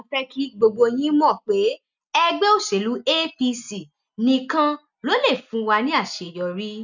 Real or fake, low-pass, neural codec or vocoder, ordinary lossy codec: real; 7.2 kHz; none; none